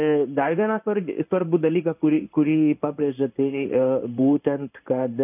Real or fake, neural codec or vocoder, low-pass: fake; codec, 16 kHz in and 24 kHz out, 1 kbps, XY-Tokenizer; 3.6 kHz